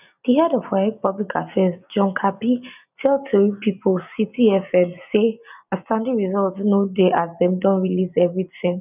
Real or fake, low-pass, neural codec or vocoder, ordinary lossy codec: real; 3.6 kHz; none; none